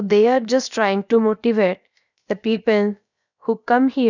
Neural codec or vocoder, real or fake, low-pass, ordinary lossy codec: codec, 16 kHz, about 1 kbps, DyCAST, with the encoder's durations; fake; 7.2 kHz; none